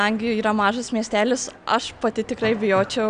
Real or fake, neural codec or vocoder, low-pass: real; none; 9.9 kHz